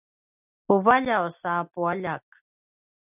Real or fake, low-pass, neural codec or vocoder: real; 3.6 kHz; none